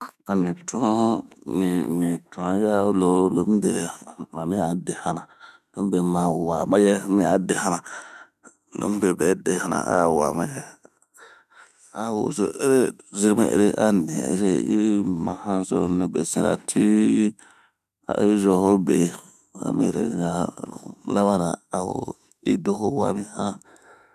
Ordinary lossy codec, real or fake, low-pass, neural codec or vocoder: none; fake; 14.4 kHz; autoencoder, 48 kHz, 32 numbers a frame, DAC-VAE, trained on Japanese speech